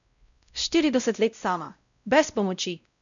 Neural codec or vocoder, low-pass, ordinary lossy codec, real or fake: codec, 16 kHz, 0.5 kbps, X-Codec, WavLM features, trained on Multilingual LibriSpeech; 7.2 kHz; none; fake